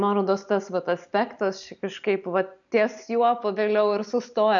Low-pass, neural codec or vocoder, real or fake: 7.2 kHz; none; real